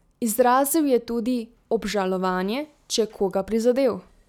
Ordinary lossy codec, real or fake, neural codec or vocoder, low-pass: none; real; none; 19.8 kHz